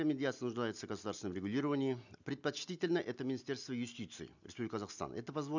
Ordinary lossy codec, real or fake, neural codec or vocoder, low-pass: none; real; none; 7.2 kHz